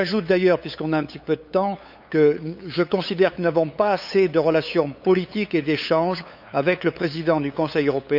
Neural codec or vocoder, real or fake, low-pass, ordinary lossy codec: codec, 16 kHz, 8 kbps, FunCodec, trained on LibriTTS, 25 frames a second; fake; 5.4 kHz; none